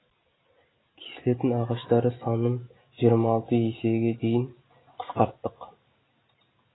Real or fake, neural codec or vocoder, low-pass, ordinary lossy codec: real; none; 7.2 kHz; AAC, 16 kbps